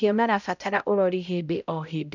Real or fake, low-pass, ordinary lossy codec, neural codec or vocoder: fake; 7.2 kHz; none; codec, 16 kHz, 0.5 kbps, X-Codec, HuBERT features, trained on balanced general audio